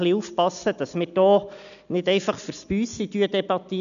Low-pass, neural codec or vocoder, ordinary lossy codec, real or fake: 7.2 kHz; none; none; real